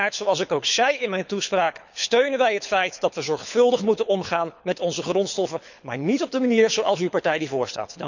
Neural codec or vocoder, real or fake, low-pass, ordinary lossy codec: codec, 24 kHz, 6 kbps, HILCodec; fake; 7.2 kHz; none